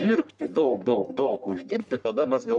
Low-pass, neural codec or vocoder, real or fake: 10.8 kHz; codec, 44.1 kHz, 1.7 kbps, Pupu-Codec; fake